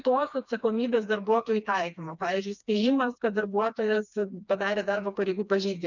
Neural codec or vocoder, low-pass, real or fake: codec, 16 kHz, 2 kbps, FreqCodec, smaller model; 7.2 kHz; fake